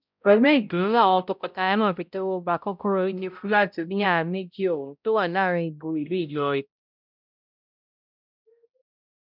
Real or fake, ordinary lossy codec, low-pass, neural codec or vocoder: fake; none; 5.4 kHz; codec, 16 kHz, 0.5 kbps, X-Codec, HuBERT features, trained on balanced general audio